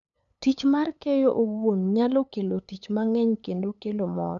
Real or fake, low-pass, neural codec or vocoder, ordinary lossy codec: fake; 7.2 kHz; codec, 16 kHz, 8 kbps, FunCodec, trained on LibriTTS, 25 frames a second; none